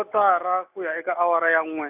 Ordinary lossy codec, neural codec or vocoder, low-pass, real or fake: none; none; 3.6 kHz; real